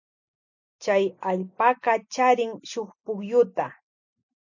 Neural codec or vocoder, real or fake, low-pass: none; real; 7.2 kHz